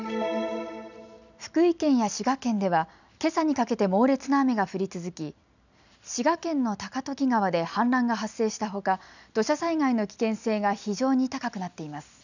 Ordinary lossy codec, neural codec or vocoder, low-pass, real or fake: none; none; 7.2 kHz; real